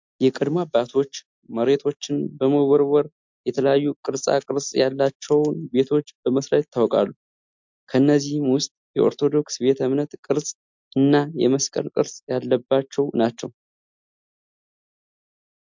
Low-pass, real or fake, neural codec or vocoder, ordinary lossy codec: 7.2 kHz; real; none; MP3, 64 kbps